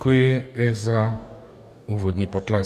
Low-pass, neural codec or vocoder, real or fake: 14.4 kHz; codec, 44.1 kHz, 2.6 kbps, DAC; fake